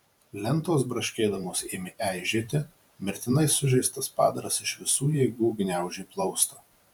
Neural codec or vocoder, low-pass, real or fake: vocoder, 44.1 kHz, 128 mel bands every 512 samples, BigVGAN v2; 19.8 kHz; fake